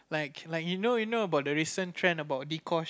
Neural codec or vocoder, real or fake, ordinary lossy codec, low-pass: none; real; none; none